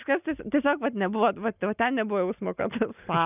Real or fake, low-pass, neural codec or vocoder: real; 3.6 kHz; none